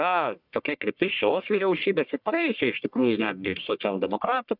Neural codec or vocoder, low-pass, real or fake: codec, 44.1 kHz, 1.7 kbps, Pupu-Codec; 5.4 kHz; fake